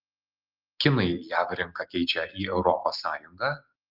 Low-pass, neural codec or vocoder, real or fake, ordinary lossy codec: 5.4 kHz; none; real; Opus, 24 kbps